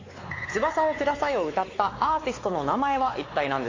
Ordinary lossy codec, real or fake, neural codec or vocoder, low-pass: AAC, 32 kbps; fake; codec, 16 kHz, 4 kbps, X-Codec, WavLM features, trained on Multilingual LibriSpeech; 7.2 kHz